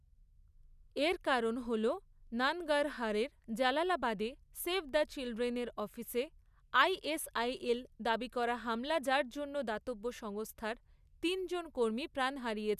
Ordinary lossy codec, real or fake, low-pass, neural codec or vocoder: none; real; 14.4 kHz; none